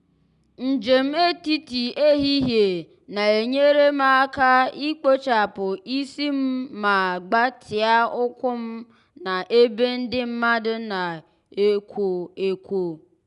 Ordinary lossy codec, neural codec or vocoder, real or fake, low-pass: none; none; real; 10.8 kHz